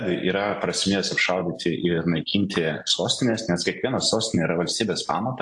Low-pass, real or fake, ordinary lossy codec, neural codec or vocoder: 10.8 kHz; real; AAC, 64 kbps; none